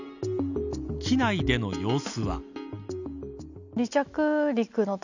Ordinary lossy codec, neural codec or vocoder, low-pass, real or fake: none; none; 7.2 kHz; real